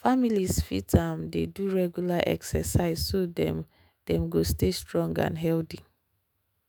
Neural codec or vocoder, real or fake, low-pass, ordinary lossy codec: autoencoder, 48 kHz, 128 numbers a frame, DAC-VAE, trained on Japanese speech; fake; none; none